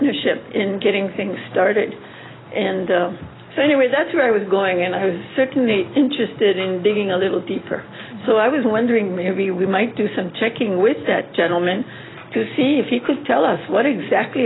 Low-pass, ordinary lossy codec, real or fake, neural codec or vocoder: 7.2 kHz; AAC, 16 kbps; real; none